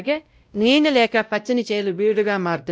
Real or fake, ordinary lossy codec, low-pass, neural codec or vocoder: fake; none; none; codec, 16 kHz, 0.5 kbps, X-Codec, WavLM features, trained on Multilingual LibriSpeech